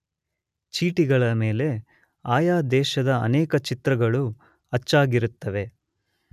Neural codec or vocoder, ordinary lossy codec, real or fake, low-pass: none; none; real; 14.4 kHz